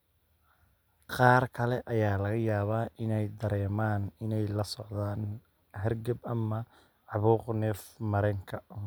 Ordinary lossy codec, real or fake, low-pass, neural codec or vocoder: none; real; none; none